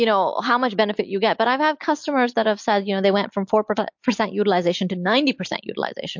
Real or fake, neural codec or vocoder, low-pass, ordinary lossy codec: real; none; 7.2 kHz; MP3, 48 kbps